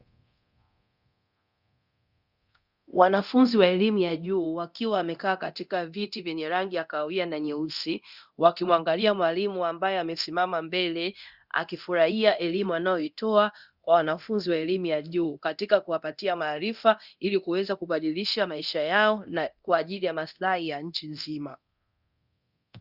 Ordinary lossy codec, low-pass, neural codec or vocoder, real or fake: Opus, 64 kbps; 5.4 kHz; codec, 24 kHz, 0.9 kbps, DualCodec; fake